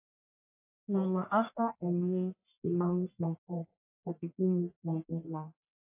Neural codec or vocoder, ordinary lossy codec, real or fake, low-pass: codec, 44.1 kHz, 1.7 kbps, Pupu-Codec; AAC, 32 kbps; fake; 3.6 kHz